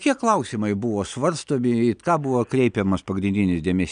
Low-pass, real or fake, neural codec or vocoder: 9.9 kHz; real; none